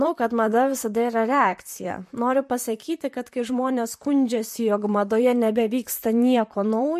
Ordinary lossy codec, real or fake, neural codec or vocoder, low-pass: MP3, 64 kbps; fake; vocoder, 44.1 kHz, 128 mel bands, Pupu-Vocoder; 14.4 kHz